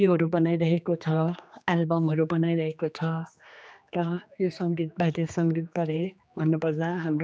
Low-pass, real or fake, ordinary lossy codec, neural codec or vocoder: none; fake; none; codec, 16 kHz, 2 kbps, X-Codec, HuBERT features, trained on general audio